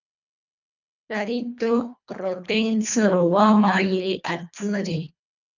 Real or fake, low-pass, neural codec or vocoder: fake; 7.2 kHz; codec, 24 kHz, 1.5 kbps, HILCodec